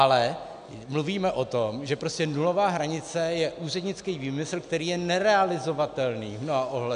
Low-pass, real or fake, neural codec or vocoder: 9.9 kHz; real; none